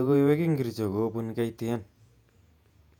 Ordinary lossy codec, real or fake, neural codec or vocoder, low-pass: none; fake; vocoder, 48 kHz, 128 mel bands, Vocos; 19.8 kHz